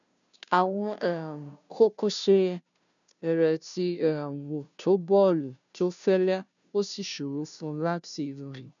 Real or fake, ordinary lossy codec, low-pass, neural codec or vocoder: fake; none; 7.2 kHz; codec, 16 kHz, 0.5 kbps, FunCodec, trained on Chinese and English, 25 frames a second